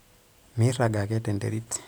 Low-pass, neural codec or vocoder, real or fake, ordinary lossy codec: none; none; real; none